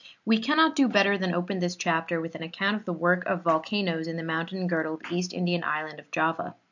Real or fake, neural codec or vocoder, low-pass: real; none; 7.2 kHz